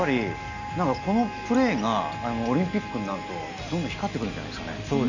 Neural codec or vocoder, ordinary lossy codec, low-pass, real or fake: none; none; 7.2 kHz; real